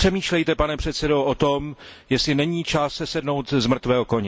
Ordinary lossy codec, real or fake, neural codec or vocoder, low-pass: none; real; none; none